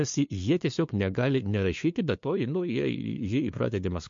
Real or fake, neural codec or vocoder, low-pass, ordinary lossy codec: fake; codec, 16 kHz, 2 kbps, FunCodec, trained on LibriTTS, 25 frames a second; 7.2 kHz; MP3, 48 kbps